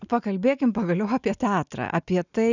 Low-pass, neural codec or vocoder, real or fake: 7.2 kHz; none; real